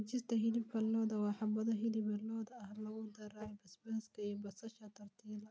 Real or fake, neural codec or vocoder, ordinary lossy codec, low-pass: real; none; none; none